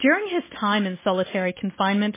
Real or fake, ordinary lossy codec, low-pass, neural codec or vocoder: real; MP3, 16 kbps; 3.6 kHz; none